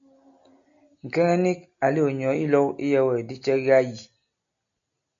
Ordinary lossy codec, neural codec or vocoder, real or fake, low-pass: AAC, 64 kbps; none; real; 7.2 kHz